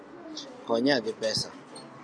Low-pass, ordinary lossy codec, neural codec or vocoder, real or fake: 9.9 kHz; MP3, 96 kbps; none; real